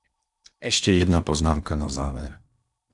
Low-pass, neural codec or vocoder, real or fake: 10.8 kHz; codec, 16 kHz in and 24 kHz out, 0.8 kbps, FocalCodec, streaming, 65536 codes; fake